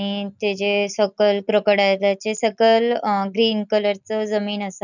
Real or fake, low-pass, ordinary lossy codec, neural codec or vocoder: real; 7.2 kHz; none; none